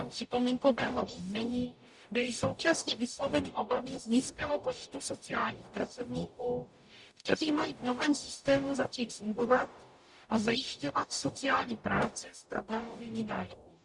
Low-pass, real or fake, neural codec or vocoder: 10.8 kHz; fake; codec, 44.1 kHz, 0.9 kbps, DAC